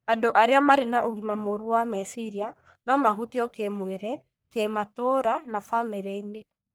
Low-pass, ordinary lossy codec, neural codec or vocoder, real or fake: none; none; codec, 44.1 kHz, 1.7 kbps, Pupu-Codec; fake